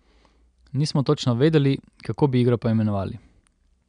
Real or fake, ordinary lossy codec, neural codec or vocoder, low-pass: real; none; none; 9.9 kHz